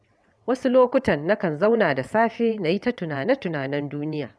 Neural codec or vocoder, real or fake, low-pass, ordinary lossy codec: vocoder, 22.05 kHz, 80 mel bands, WaveNeXt; fake; none; none